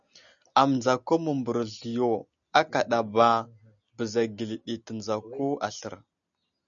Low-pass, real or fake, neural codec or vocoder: 7.2 kHz; real; none